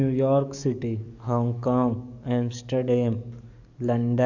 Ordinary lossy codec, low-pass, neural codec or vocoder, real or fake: none; 7.2 kHz; codec, 44.1 kHz, 7.8 kbps, DAC; fake